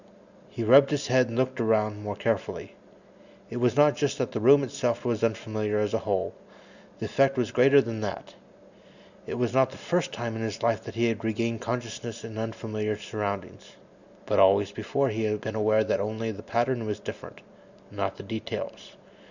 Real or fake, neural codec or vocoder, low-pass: real; none; 7.2 kHz